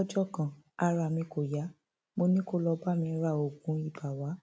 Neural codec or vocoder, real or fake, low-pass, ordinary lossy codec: none; real; none; none